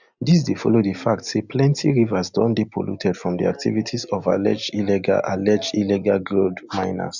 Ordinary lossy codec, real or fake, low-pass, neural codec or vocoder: none; real; 7.2 kHz; none